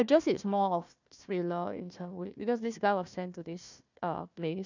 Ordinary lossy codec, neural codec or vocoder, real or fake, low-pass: none; codec, 16 kHz, 1 kbps, FunCodec, trained on Chinese and English, 50 frames a second; fake; 7.2 kHz